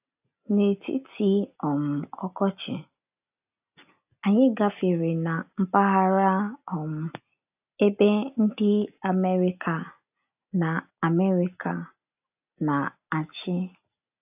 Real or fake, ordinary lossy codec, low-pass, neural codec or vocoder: real; AAC, 32 kbps; 3.6 kHz; none